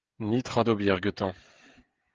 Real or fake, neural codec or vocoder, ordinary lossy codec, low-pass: fake; codec, 16 kHz, 16 kbps, FreqCodec, smaller model; Opus, 16 kbps; 7.2 kHz